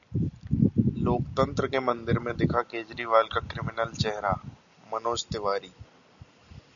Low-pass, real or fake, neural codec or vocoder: 7.2 kHz; real; none